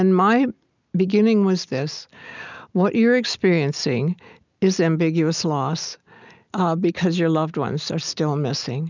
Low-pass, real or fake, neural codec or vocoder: 7.2 kHz; real; none